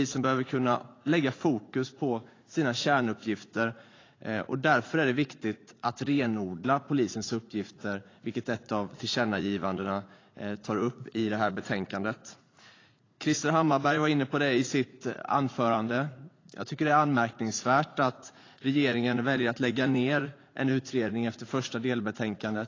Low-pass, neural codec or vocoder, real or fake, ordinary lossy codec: 7.2 kHz; vocoder, 44.1 kHz, 80 mel bands, Vocos; fake; AAC, 32 kbps